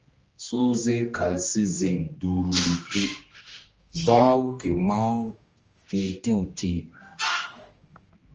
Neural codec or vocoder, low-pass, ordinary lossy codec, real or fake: codec, 16 kHz, 1 kbps, X-Codec, HuBERT features, trained on general audio; 7.2 kHz; Opus, 24 kbps; fake